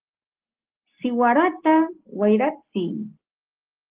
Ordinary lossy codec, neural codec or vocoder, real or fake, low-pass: Opus, 16 kbps; none; real; 3.6 kHz